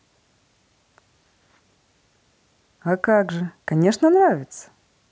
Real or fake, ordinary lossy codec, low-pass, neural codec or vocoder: real; none; none; none